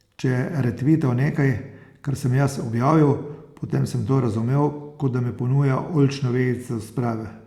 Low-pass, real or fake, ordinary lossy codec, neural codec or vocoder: 19.8 kHz; real; Opus, 64 kbps; none